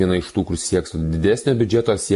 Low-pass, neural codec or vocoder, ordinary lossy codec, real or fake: 14.4 kHz; none; MP3, 48 kbps; real